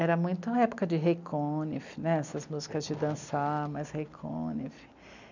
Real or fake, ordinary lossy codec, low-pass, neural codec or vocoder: real; none; 7.2 kHz; none